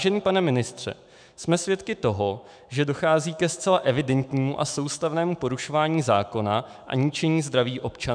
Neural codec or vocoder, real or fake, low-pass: autoencoder, 48 kHz, 128 numbers a frame, DAC-VAE, trained on Japanese speech; fake; 9.9 kHz